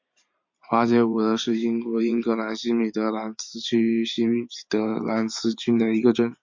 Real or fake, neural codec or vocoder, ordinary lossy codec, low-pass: fake; vocoder, 44.1 kHz, 128 mel bands every 512 samples, BigVGAN v2; MP3, 48 kbps; 7.2 kHz